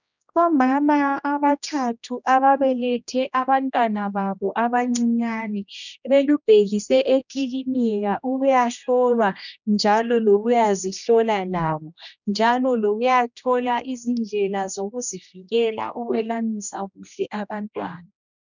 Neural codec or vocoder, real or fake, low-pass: codec, 16 kHz, 1 kbps, X-Codec, HuBERT features, trained on general audio; fake; 7.2 kHz